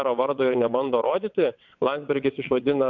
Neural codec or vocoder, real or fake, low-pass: vocoder, 22.05 kHz, 80 mel bands, WaveNeXt; fake; 7.2 kHz